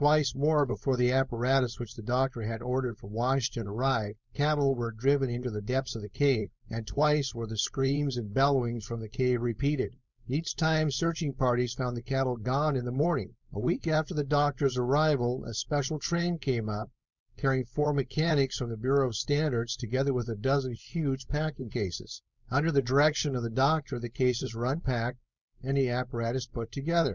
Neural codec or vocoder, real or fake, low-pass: codec, 16 kHz, 4.8 kbps, FACodec; fake; 7.2 kHz